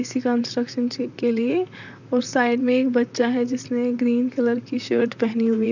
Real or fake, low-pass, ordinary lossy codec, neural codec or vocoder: fake; 7.2 kHz; none; vocoder, 44.1 kHz, 128 mel bands, Pupu-Vocoder